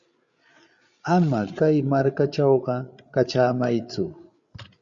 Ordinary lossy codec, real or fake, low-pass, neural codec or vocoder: Opus, 64 kbps; fake; 7.2 kHz; codec, 16 kHz, 8 kbps, FreqCodec, larger model